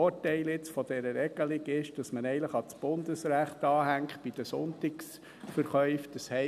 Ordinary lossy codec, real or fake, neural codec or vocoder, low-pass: none; real; none; 14.4 kHz